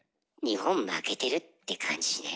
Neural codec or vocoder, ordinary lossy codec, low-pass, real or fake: none; none; none; real